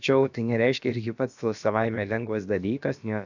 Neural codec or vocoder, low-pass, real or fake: codec, 16 kHz, about 1 kbps, DyCAST, with the encoder's durations; 7.2 kHz; fake